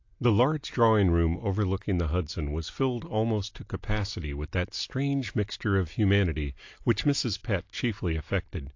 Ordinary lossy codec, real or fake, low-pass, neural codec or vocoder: AAC, 48 kbps; real; 7.2 kHz; none